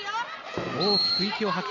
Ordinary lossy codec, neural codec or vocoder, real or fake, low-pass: none; none; real; 7.2 kHz